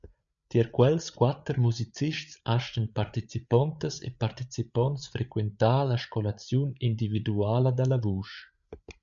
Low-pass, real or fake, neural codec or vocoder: 7.2 kHz; fake; codec, 16 kHz, 8 kbps, FreqCodec, larger model